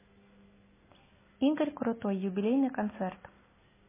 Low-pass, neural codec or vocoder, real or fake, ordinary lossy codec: 3.6 kHz; none; real; MP3, 16 kbps